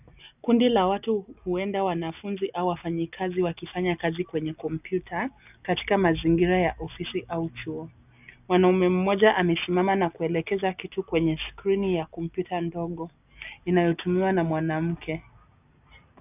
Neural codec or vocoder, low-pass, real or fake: none; 3.6 kHz; real